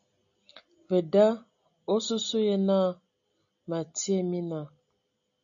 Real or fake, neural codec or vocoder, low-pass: real; none; 7.2 kHz